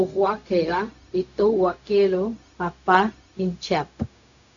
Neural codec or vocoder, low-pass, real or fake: codec, 16 kHz, 0.4 kbps, LongCat-Audio-Codec; 7.2 kHz; fake